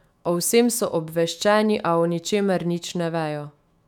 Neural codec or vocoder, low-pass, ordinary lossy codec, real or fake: autoencoder, 48 kHz, 128 numbers a frame, DAC-VAE, trained on Japanese speech; 19.8 kHz; none; fake